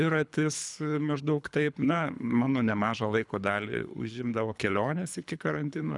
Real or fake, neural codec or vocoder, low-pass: fake; codec, 24 kHz, 3 kbps, HILCodec; 10.8 kHz